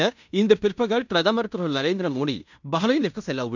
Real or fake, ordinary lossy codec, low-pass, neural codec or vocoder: fake; none; 7.2 kHz; codec, 16 kHz in and 24 kHz out, 0.9 kbps, LongCat-Audio-Codec, fine tuned four codebook decoder